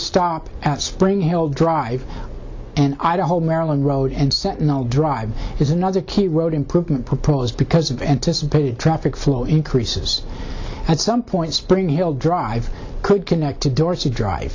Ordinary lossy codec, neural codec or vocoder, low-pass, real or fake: AAC, 48 kbps; none; 7.2 kHz; real